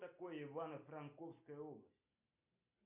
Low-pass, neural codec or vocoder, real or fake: 3.6 kHz; none; real